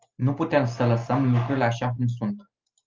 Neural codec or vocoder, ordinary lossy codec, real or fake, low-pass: none; Opus, 24 kbps; real; 7.2 kHz